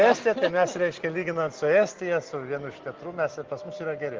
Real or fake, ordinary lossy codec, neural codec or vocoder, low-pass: real; Opus, 16 kbps; none; 7.2 kHz